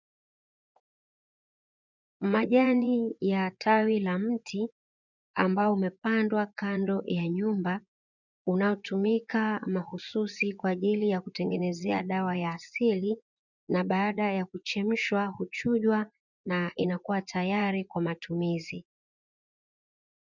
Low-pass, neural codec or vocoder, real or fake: 7.2 kHz; vocoder, 24 kHz, 100 mel bands, Vocos; fake